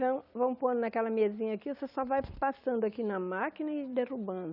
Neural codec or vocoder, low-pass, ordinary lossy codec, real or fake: none; 5.4 kHz; none; real